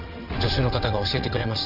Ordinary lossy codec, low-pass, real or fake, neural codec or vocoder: none; 5.4 kHz; fake; vocoder, 22.05 kHz, 80 mel bands, WaveNeXt